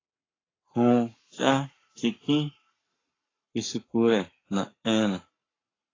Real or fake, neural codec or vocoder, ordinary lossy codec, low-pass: fake; codec, 44.1 kHz, 7.8 kbps, Pupu-Codec; AAC, 32 kbps; 7.2 kHz